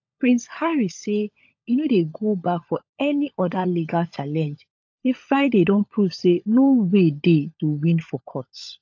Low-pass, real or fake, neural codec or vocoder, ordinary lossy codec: 7.2 kHz; fake; codec, 16 kHz, 16 kbps, FunCodec, trained on LibriTTS, 50 frames a second; AAC, 48 kbps